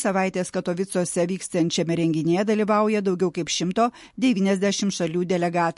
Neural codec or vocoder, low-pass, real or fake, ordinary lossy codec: none; 14.4 kHz; real; MP3, 48 kbps